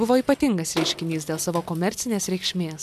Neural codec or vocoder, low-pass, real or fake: none; 14.4 kHz; real